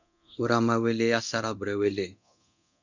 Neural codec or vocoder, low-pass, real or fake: codec, 24 kHz, 0.9 kbps, DualCodec; 7.2 kHz; fake